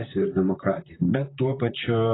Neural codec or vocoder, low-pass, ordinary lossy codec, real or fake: vocoder, 44.1 kHz, 128 mel bands, Pupu-Vocoder; 7.2 kHz; AAC, 16 kbps; fake